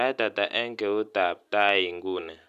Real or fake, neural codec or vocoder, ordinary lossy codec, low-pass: real; none; none; 14.4 kHz